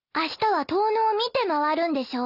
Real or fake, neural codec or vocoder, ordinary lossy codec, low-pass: real; none; none; 5.4 kHz